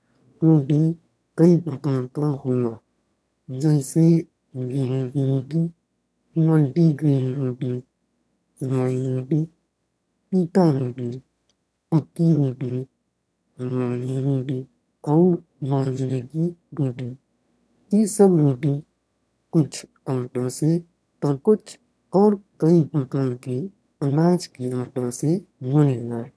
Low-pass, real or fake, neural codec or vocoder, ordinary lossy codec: none; fake; autoencoder, 22.05 kHz, a latent of 192 numbers a frame, VITS, trained on one speaker; none